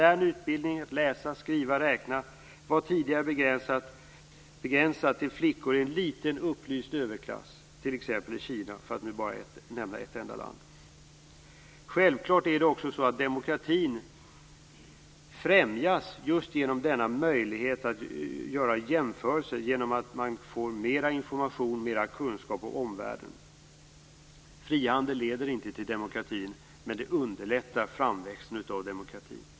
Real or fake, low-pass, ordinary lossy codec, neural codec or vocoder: real; none; none; none